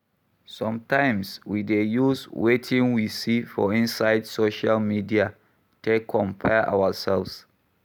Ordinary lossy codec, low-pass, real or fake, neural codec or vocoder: none; none; real; none